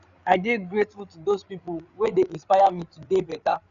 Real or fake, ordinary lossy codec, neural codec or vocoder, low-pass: fake; none; codec, 16 kHz, 8 kbps, FreqCodec, larger model; 7.2 kHz